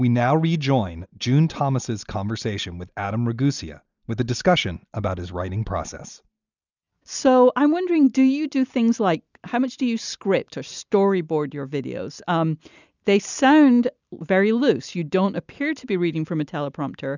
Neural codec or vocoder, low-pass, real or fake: none; 7.2 kHz; real